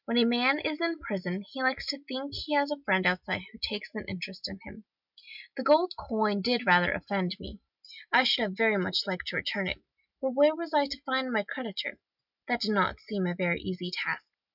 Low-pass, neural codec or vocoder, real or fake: 5.4 kHz; none; real